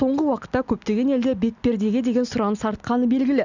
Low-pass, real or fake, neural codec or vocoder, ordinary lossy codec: 7.2 kHz; real; none; none